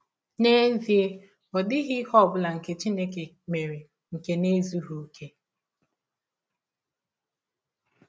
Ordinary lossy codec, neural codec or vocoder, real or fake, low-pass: none; none; real; none